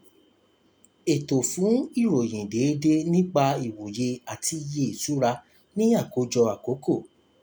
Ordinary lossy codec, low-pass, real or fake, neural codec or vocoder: none; none; real; none